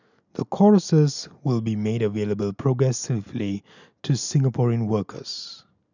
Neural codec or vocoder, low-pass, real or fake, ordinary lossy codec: none; 7.2 kHz; real; none